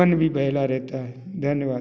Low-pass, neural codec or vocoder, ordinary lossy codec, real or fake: none; none; none; real